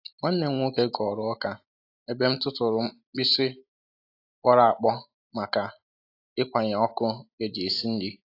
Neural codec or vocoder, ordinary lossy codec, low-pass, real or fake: none; AAC, 32 kbps; 5.4 kHz; real